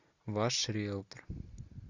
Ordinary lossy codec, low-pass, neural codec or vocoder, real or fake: Opus, 64 kbps; 7.2 kHz; none; real